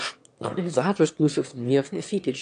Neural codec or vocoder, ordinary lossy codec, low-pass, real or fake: autoencoder, 22.05 kHz, a latent of 192 numbers a frame, VITS, trained on one speaker; AAC, 64 kbps; 9.9 kHz; fake